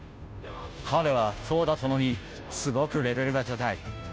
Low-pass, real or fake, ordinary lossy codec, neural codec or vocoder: none; fake; none; codec, 16 kHz, 0.5 kbps, FunCodec, trained on Chinese and English, 25 frames a second